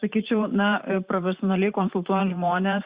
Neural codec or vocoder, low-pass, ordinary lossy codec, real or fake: vocoder, 44.1 kHz, 128 mel bands every 512 samples, BigVGAN v2; 3.6 kHz; Opus, 64 kbps; fake